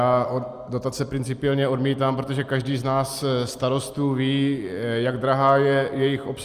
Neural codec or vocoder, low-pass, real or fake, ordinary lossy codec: none; 14.4 kHz; real; Opus, 32 kbps